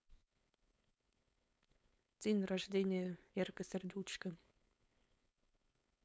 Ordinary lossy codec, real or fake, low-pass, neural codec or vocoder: none; fake; none; codec, 16 kHz, 4.8 kbps, FACodec